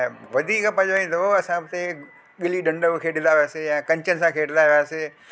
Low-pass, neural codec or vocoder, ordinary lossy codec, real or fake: none; none; none; real